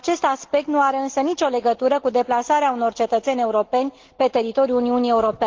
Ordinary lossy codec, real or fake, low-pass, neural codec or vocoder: Opus, 16 kbps; real; 7.2 kHz; none